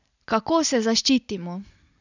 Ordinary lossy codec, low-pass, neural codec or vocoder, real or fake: none; 7.2 kHz; none; real